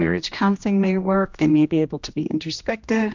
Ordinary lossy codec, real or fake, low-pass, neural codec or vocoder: MP3, 64 kbps; fake; 7.2 kHz; codec, 16 kHz, 1 kbps, X-Codec, HuBERT features, trained on general audio